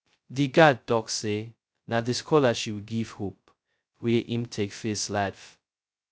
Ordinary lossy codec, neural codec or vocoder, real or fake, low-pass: none; codec, 16 kHz, 0.2 kbps, FocalCodec; fake; none